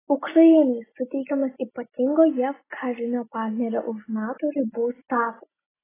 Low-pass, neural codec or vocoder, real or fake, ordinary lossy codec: 3.6 kHz; none; real; AAC, 16 kbps